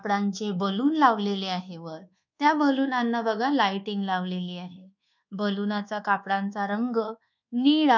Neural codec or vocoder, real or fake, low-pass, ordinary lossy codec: codec, 24 kHz, 1.2 kbps, DualCodec; fake; 7.2 kHz; none